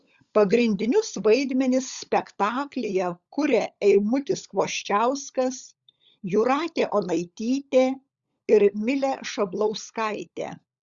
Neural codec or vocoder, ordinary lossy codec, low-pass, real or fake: codec, 16 kHz, 16 kbps, FunCodec, trained on LibriTTS, 50 frames a second; Opus, 64 kbps; 7.2 kHz; fake